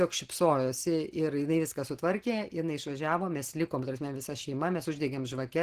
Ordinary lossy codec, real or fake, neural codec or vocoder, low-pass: Opus, 16 kbps; real; none; 14.4 kHz